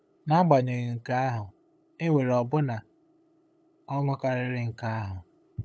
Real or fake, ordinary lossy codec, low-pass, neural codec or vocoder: fake; none; none; codec, 16 kHz, 8 kbps, FunCodec, trained on LibriTTS, 25 frames a second